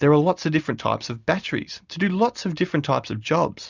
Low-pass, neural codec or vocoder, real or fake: 7.2 kHz; none; real